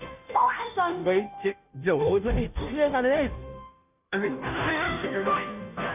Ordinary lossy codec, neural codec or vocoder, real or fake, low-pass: none; codec, 16 kHz, 0.5 kbps, FunCodec, trained on Chinese and English, 25 frames a second; fake; 3.6 kHz